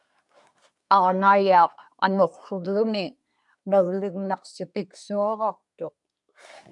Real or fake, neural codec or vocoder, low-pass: fake; codec, 24 kHz, 1 kbps, SNAC; 10.8 kHz